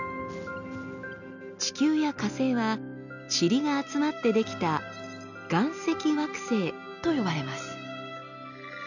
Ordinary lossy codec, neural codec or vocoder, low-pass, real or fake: none; none; 7.2 kHz; real